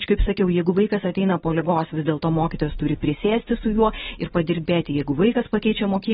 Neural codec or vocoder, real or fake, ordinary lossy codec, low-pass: none; real; AAC, 16 kbps; 7.2 kHz